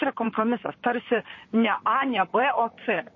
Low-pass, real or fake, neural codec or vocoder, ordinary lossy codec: 7.2 kHz; fake; vocoder, 44.1 kHz, 128 mel bands, Pupu-Vocoder; MP3, 32 kbps